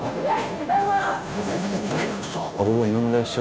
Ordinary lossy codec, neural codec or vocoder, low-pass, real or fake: none; codec, 16 kHz, 0.5 kbps, FunCodec, trained on Chinese and English, 25 frames a second; none; fake